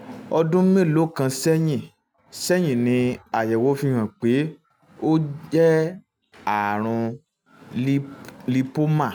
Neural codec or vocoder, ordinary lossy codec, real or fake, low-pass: none; none; real; none